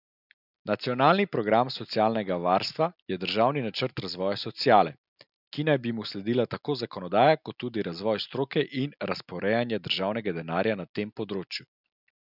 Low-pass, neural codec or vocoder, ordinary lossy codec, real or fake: 5.4 kHz; none; none; real